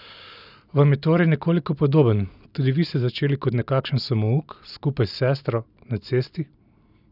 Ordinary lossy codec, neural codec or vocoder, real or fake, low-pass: none; none; real; 5.4 kHz